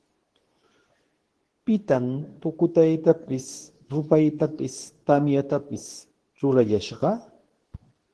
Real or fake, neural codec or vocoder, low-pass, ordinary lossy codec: fake; codec, 24 kHz, 0.9 kbps, WavTokenizer, medium speech release version 2; 10.8 kHz; Opus, 16 kbps